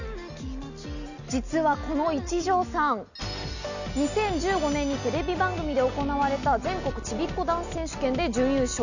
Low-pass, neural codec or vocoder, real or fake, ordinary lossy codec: 7.2 kHz; none; real; none